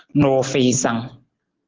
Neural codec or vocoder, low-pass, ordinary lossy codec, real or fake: none; 7.2 kHz; Opus, 16 kbps; real